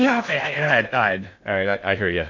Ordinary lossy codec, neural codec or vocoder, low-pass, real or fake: MP3, 48 kbps; codec, 16 kHz in and 24 kHz out, 0.6 kbps, FocalCodec, streaming, 2048 codes; 7.2 kHz; fake